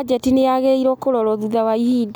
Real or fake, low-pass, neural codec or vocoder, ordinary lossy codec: real; none; none; none